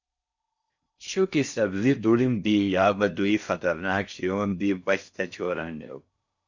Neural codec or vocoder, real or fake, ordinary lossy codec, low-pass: codec, 16 kHz in and 24 kHz out, 0.6 kbps, FocalCodec, streaming, 4096 codes; fake; Opus, 64 kbps; 7.2 kHz